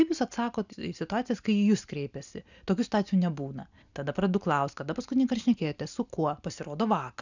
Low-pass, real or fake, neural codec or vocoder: 7.2 kHz; real; none